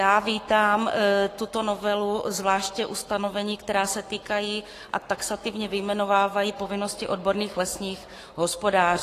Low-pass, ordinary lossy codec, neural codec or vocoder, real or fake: 14.4 kHz; AAC, 48 kbps; codec, 44.1 kHz, 7.8 kbps, Pupu-Codec; fake